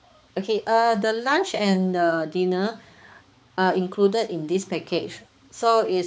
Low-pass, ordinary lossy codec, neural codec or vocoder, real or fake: none; none; codec, 16 kHz, 4 kbps, X-Codec, HuBERT features, trained on balanced general audio; fake